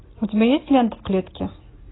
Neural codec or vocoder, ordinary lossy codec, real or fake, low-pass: none; AAC, 16 kbps; real; 7.2 kHz